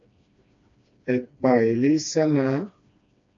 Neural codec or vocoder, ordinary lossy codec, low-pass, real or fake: codec, 16 kHz, 2 kbps, FreqCodec, smaller model; AAC, 48 kbps; 7.2 kHz; fake